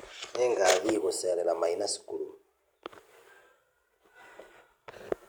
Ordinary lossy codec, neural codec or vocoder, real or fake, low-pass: none; none; real; none